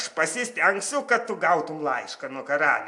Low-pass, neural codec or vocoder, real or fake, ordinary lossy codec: 10.8 kHz; none; real; AAC, 64 kbps